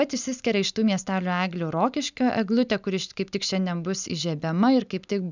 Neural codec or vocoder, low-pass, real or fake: none; 7.2 kHz; real